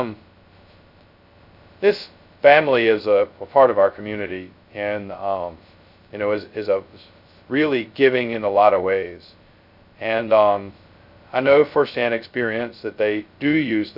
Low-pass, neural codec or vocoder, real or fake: 5.4 kHz; codec, 16 kHz, 0.2 kbps, FocalCodec; fake